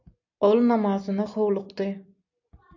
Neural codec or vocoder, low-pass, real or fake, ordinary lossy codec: none; 7.2 kHz; real; AAC, 48 kbps